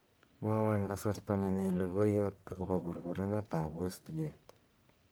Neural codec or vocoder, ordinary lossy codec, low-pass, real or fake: codec, 44.1 kHz, 1.7 kbps, Pupu-Codec; none; none; fake